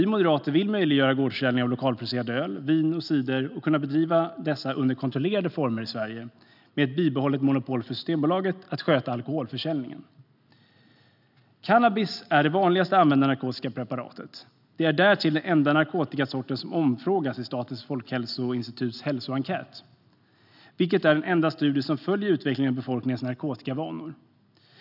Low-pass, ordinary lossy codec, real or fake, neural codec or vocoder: 5.4 kHz; none; real; none